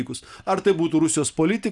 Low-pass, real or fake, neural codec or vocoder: 10.8 kHz; real; none